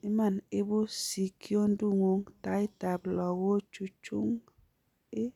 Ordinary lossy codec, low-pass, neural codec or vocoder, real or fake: none; 19.8 kHz; none; real